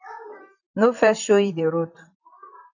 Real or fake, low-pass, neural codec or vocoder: fake; 7.2 kHz; vocoder, 44.1 kHz, 128 mel bands, Pupu-Vocoder